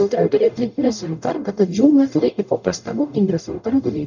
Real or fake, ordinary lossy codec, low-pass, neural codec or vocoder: fake; none; 7.2 kHz; codec, 44.1 kHz, 0.9 kbps, DAC